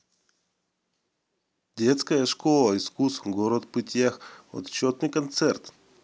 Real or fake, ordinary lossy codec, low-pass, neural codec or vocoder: real; none; none; none